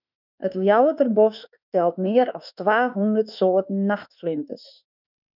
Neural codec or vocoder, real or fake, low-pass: autoencoder, 48 kHz, 32 numbers a frame, DAC-VAE, trained on Japanese speech; fake; 5.4 kHz